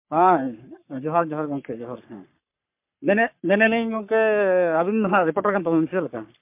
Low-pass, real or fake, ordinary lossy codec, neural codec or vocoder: 3.6 kHz; fake; MP3, 32 kbps; codec, 44.1 kHz, 3.4 kbps, Pupu-Codec